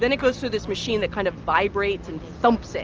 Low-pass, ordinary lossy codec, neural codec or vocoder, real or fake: 7.2 kHz; Opus, 24 kbps; none; real